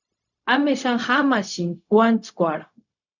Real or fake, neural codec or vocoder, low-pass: fake; codec, 16 kHz, 0.4 kbps, LongCat-Audio-Codec; 7.2 kHz